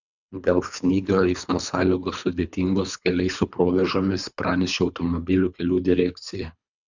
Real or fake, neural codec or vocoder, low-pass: fake; codec, 24 kHz, 3 kbps, HILCodec; 7.2 kHz